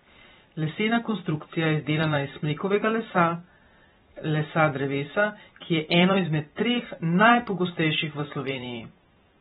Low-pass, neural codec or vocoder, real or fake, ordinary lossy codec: 19.8 kHz; vocoder, 44.1 kHz, 128 mel bands every 512 samples, BigVGAN v2; fake; AAC, 16 kbps